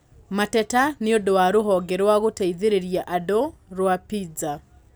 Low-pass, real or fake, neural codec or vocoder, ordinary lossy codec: none; real; none; none